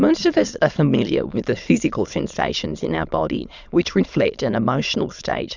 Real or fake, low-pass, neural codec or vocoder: fake; 7.2 kHz; autoencoder, 22.05 kHz, a latent of 192 numbers a frame, VITS, trained on many speakers